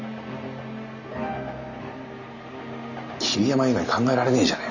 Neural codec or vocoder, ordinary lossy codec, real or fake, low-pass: none; none; real; 7.2 kHz